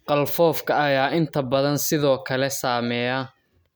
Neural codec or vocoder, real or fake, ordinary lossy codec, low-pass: none; real; none; none